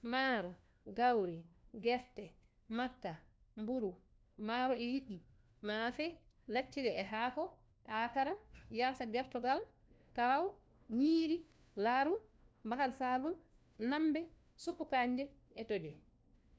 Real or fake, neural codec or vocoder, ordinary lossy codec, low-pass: fake; codec, 16 kHz, 1 kbps, FunCodec, trained on LibriTTS, 50 frames a second; none; none